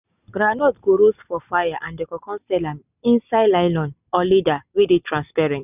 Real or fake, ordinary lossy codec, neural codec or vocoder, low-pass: real; none; none; 3.6 kHz